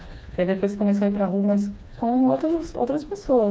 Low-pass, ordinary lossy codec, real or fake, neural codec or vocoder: none; none; fake; codec, 16 kHz, 2 kbps, FreqCodec, smaller model